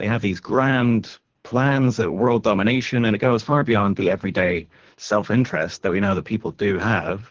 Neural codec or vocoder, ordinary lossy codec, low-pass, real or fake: codec, 24 kHz, 3 kbps, HILCodec; Opus, 16 kbps; 7.2 kHz; fake